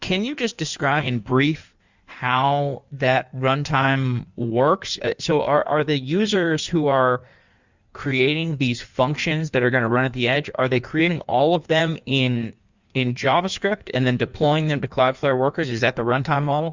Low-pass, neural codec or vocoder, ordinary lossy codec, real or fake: 7.2 kHz; codec, 16 kHz in and 24 kHz out, 1.1 kbps, FireRedTTS-2 codec; Opus, 64 kbps; fake